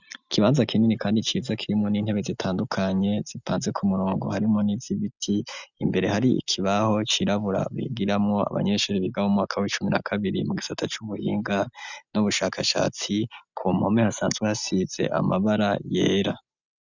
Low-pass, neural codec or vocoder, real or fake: 7.2 kHz; none; real